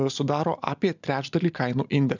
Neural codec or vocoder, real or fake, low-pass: none; real; 7.2 kHz